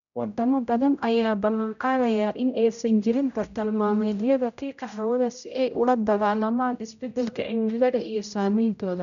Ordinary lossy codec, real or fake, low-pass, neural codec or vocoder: none; fake; 7.2 kHz; codec, 16 kHz, 0.5 kbps, X-Codec, HuBERT features, trained on general audio